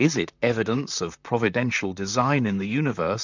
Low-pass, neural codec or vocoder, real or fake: 7.2 kHz; vocoder, 44.1 kHz, 128 mel bands, Pupu-Vocoder; fake